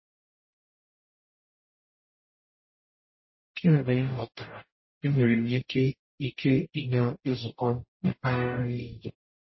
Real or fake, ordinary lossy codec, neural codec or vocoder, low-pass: fake; MP3, 24 kbps; codec, 44.1 kHz, 0.9 kbps, DAC; 7.2 kHz